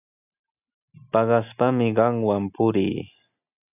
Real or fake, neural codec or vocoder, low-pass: real; none; 3.6 kHz